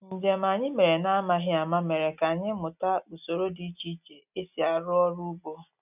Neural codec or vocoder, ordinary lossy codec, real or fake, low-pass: none; none; real; 3.6 kHz